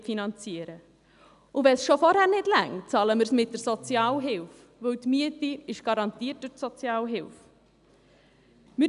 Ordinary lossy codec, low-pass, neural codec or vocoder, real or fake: none; 10.8 kHz; none; real